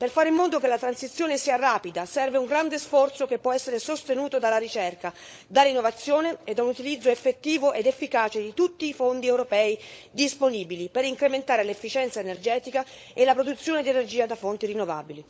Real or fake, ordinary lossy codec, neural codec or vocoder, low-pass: fake; none; codec, 16 kHz, 16 kbps, FunCodec, trained on LibriTTS, 50 frames a second; none